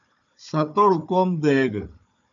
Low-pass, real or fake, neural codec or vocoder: 7.2 kHz; fake; codec, 16 kHz, 4 kbps, FunCodec, trained on Chinese and English, 50 frames a second